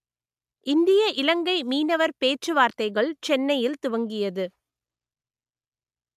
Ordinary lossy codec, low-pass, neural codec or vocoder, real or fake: MP3, 96 kbps; 14.4 kHz; none; real